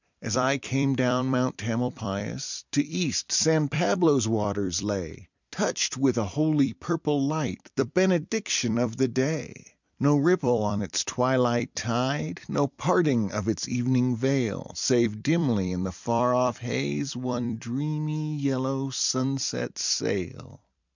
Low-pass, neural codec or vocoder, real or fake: 7.2 kHz; vocoder, 44.1 kHz, 128 mel bands every 256 samples, BigVGAN v2; fake